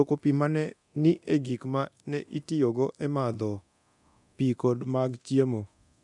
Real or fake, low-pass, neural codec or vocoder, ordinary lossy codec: fake; 10.8 kHz; codec, 24 kHz, 0.9 kbps, DualCodec; none